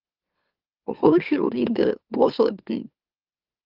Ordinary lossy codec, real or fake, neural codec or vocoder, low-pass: Opus, 32 kbps; fake; autoencoder, 44.1 kHz, a latent of 192 numbers a frame, MeloTTS; 5.4 kHz